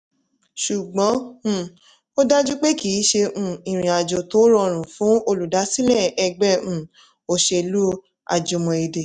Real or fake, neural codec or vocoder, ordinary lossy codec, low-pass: real; none; none; 9.9 kHz